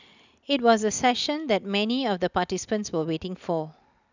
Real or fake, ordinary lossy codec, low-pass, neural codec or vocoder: real; none; 7.2 kHz; none